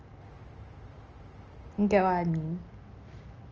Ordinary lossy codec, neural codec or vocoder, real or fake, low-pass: Opus, 24 kbps; none; real; 7.2 kHz